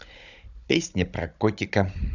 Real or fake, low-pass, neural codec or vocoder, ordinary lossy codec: fake; 7.2 kHz; codec, 16 kHz, 16 kbps, FunCodec, trained on Chinese and English, 50 frames a second; none